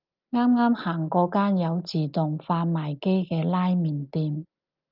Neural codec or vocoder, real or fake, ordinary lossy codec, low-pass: none; real; Opus, 24 kbps; 5.4 kHz